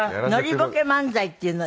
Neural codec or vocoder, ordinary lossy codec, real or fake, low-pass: none; none; real; none